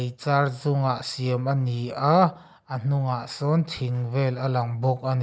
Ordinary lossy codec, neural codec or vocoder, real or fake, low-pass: none; none; real; none